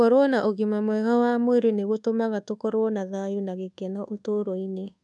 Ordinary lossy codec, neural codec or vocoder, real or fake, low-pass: none; codec, 24 kHz, 1.2 kbps, DualCodec; fake; 10.8 kHz